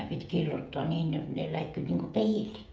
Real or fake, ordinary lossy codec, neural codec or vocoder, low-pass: fake; none; codec, 16 kHz, 16 kbps, FreqCodec, smaller model; none